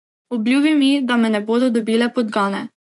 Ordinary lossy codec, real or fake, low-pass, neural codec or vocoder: none; fake; 10.8 kHz; vocoder, 24 kHz, 100 mel bands, Vocos